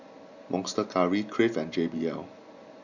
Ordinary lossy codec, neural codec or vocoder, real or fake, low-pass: none; none; real; 7.2 kHz